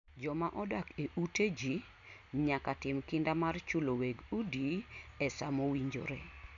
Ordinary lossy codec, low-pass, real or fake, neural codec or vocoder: none; 7.2 kHz; real; none